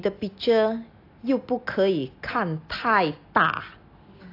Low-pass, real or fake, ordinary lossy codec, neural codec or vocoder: 5.4 kHz; real; AAC, 32 kbps; none